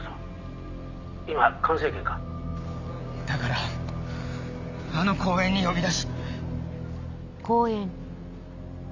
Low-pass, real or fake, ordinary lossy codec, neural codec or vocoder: 7.2 kHz; real; none; none